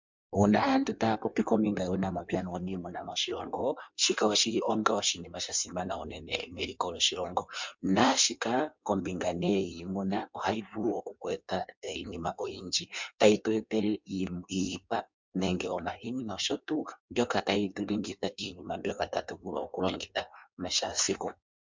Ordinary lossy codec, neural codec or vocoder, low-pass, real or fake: MP3, 64 kbps; codec, 16 kHz in and 24 kHz out, 1.1 kbps, FireRedTTS-2 codec; 7.2 kHz; fake